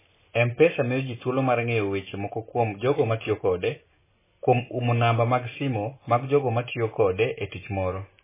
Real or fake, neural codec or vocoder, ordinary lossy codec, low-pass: real; none; MP3, 16 kbps; 3.6 kHz